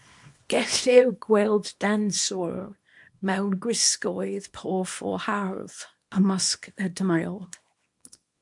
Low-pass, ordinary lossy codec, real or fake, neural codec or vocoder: 10.8 kHz; MP3, 64 kbps; fake; codec, 24 kHz, 0.9 kbps, WavTokenizer, small release